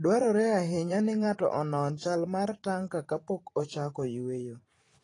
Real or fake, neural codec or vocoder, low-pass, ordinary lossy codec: fake; vocoder, 44.1 kHz, 128 mel bands every 512 samples, BigVGAN v2; 10.8 kHz; AAC, 32 kbps